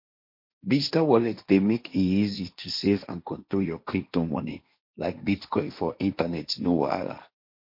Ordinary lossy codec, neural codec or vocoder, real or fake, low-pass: MP3, 32 kbps; codec, 16 kHz, 1.1 kbps, Voila-Tokenizer; fake; 5.4 kHz